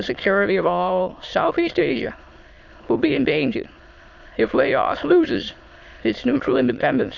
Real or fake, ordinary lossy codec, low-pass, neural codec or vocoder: fake; AAC, 48 kbps; 7.2 kHz; autoencoder, 22.05 kHz, a latent of 192 numbers a frame, VITS, trained on many speakers